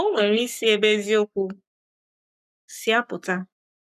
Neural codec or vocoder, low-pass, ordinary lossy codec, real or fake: codec, 44.1 kHz, 7.8 kbps, Pupu-Codec; 14.4 kHz; none; fake